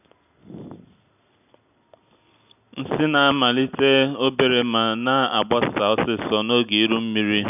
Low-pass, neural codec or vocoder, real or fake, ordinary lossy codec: 3.6 kHz; none; real; none